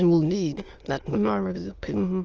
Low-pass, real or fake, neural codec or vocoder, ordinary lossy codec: 7.2 kHz; fake; autoencoder, 22.05 kHz, a latent of 192 numbers a frame, VITS, trained on many speakers; Opus, 24 kbps